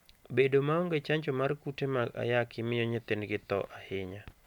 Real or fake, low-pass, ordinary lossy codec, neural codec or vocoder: real; 19.8 kHz; none; none